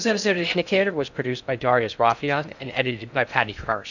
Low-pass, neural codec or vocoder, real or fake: 7.2 kHz; codec, 16 kHz in and 24 kHz out, 0.6 kbps, FocalCodec, streaming, 2048 codes; fake